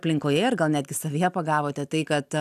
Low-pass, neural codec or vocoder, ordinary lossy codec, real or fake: 14.4 kHz; none; AAC, 96 kbps; real